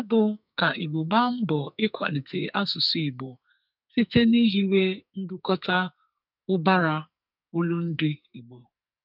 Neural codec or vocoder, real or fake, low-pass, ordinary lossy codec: codec, 44.1 kHz, 2.6 kbps, SNAC; fake; 5.4 kHz; none